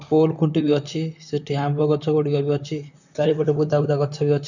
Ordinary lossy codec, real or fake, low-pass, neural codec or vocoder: none; fake; 7.2 kHz; vocoder, 44.1 kHz, 128 mel bands, Pupu-Vocoder